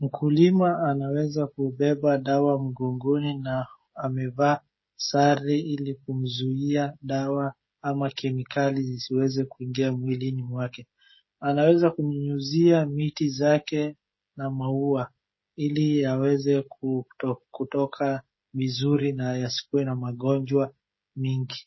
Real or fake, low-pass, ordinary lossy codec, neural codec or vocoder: fake; 7.2 kHz; MP3, 24 kbps; codec, 16 kHz, 16 kbps, FreqCodec, smaller model